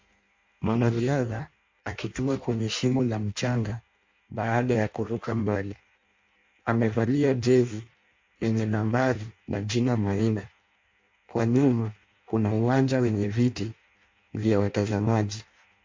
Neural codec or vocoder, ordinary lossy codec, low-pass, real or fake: codec, 16 kHz in and 24 kHz out, 0.6 kbps, FireRedTTS-2 codec; MP3, 48 kbps; 7.2 kHz; fake